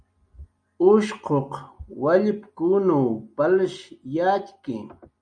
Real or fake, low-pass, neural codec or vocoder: real; 9.9 kHz; none